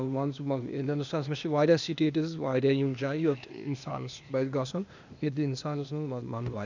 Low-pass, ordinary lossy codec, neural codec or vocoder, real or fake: 7.2 kHz; none; codec, 16 kHz, 0.8 kbps, ZipCodec; fake